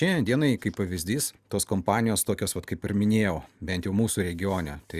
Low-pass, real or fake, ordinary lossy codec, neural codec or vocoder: 14.4 kHz; real; Opus, 64 kbps; none